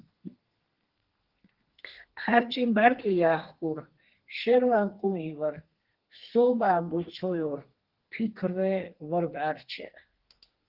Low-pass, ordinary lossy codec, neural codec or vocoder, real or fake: 5.4 kHz; Opus, 16 kbps; codec, 32 kHz, 1.9 kbps, SNAC; fake